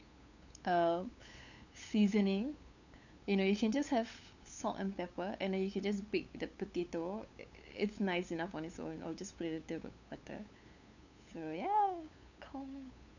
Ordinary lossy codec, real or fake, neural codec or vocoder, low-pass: none; fake; codec, 16 kHz, 8 kbps, FunCodec, trained on LibriTTS, 25 frames a second; 7.2 kHz